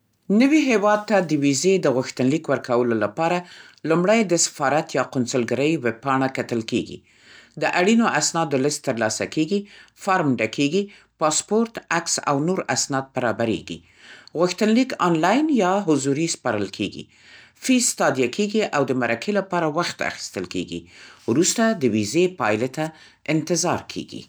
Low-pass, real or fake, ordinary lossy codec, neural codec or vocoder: none; real; none; none